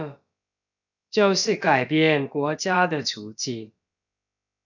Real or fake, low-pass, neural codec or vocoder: fake; 7.2 kHz; codec, 16 kHz, about 1 kbps, DyCAST, with the encoder's durations